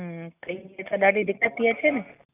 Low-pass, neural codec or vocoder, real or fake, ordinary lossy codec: 3.6 kHz; none; real; none